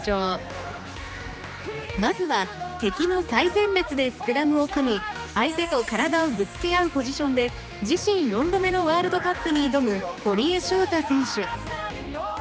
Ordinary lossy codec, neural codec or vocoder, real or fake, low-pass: none; codec, 16 kHz, 2 kbps, X-Codec, HuBERT features, trained on general audio; fake; none